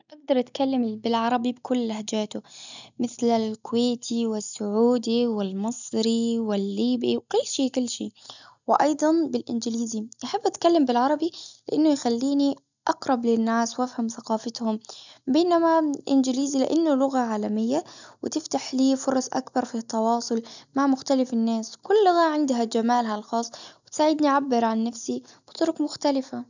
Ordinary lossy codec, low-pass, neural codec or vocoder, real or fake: none; 7.2 kHz; none; real